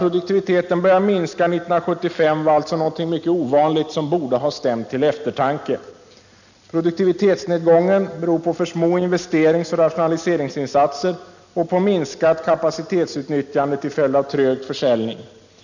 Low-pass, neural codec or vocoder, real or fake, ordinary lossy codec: 7.2 kHz; none; real; none